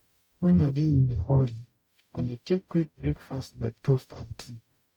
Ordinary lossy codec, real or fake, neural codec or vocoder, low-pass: none; fake; codec, 44.1 kHz, 0.9 kbps, DAC; 19.8 kHz